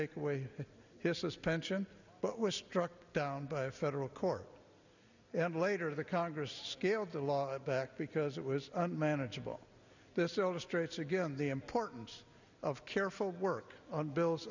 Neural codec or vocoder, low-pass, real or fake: none; 7.2 kHz; real